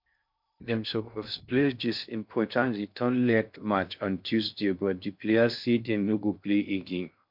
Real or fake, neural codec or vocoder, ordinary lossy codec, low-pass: fake; codec, 16 kHz in and 24 kHz out, 0.6 kbps, FocalCodec, streaming, 2048 codes; MP3, 48 kbps; 5.4 kHz